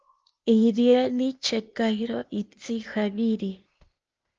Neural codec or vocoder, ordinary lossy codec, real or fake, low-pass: codec, 16 kHz, 0.8 kbps, ZipCodec; Opus, 24 kbps; fake; 7.2 kHz